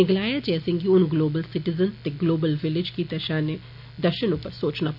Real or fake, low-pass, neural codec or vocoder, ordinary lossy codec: real; 5.4 kHz; none; none